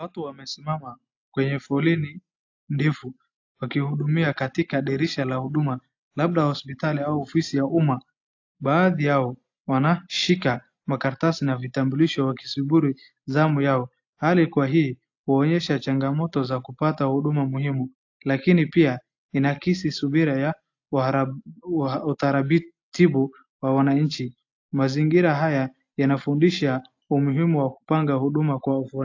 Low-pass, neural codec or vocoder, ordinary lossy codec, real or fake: 7.2 kHz; none; AAC, 48 kbps; real